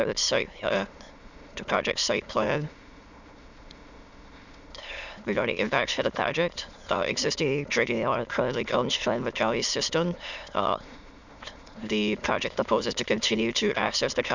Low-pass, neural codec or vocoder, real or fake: 7.2 kHz; autoencoder, 22.05 kHz, a latent of 192 numbers a frame, VITS, trained on many speakers; fake